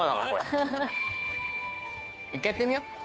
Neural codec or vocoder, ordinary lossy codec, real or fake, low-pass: codec, 16 kHz, 2 kbps, FunCodec, trained on Chinese and English, 25 frames a second; none; fake; none